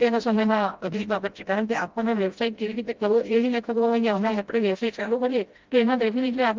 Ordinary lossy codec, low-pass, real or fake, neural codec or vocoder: Opus, 32 kbps; 7.2 kHz; fake; codec, 16 kHz, 0.5 kbps, FreqCodec, smaller model